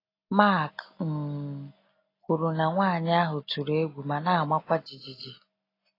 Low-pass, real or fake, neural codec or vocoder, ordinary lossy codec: 5.4 kHz; real; none; AAC, 24 kbps